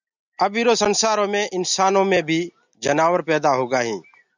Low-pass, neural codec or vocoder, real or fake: 7.2 kHz; none; real